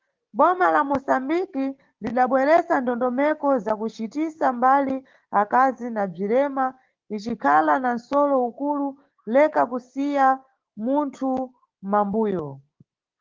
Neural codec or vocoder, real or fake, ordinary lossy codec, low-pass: codec, 16 kHz, 6 kbps, DAC; fake; Opus, 16 kbps; 7.2 kHz